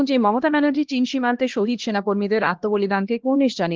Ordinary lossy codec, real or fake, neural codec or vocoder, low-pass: Opus, 16 kbps; fake; codec, 16 kHz, 1 kbps, X-Codec, HuBERT features, trained on LibriSpeech; 7.2 kHz